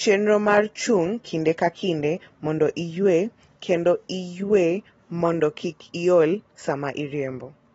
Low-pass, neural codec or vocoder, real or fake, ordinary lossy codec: 19.8 kHz; none; real; AAC, 24 kbps